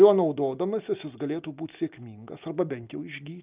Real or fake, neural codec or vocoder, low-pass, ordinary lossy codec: real; none; 3.6 kHz; Opus, 24 kbps